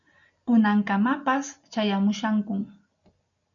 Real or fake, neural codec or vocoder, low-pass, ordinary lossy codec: real; none; 7.2 kHz; MP3, 96 kbps